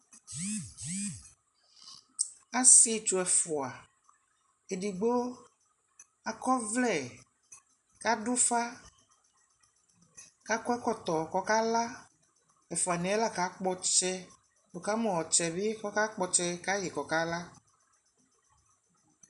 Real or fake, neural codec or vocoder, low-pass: real; none; 10.8 kHz